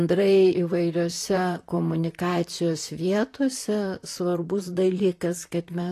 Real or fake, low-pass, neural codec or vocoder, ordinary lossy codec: fake; 14.4 kHz; vocoder, 44.1 kHz, 128 mel bands, Pupu-Vocoder; AAC, 48 kbps